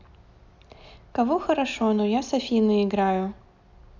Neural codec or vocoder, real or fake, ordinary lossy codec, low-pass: none; real; none; 7.2 kHz